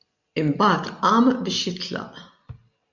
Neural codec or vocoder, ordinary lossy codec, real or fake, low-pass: none; MP3, 64 kbps; real; 7.2 kHz